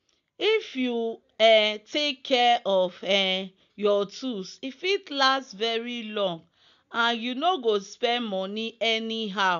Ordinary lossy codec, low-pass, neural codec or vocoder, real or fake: AAC, 96 kbps; 7.2 kHz; none; real